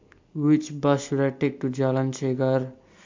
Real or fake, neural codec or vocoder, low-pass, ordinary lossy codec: real; none; 7.2 kHz; MP3, 48 kbps